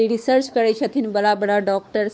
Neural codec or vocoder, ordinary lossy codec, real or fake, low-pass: codec, 16 kHz, 4 kbps, X-Codec, WavLM features, trained on Multilingual LibriSpeech; none; fake; none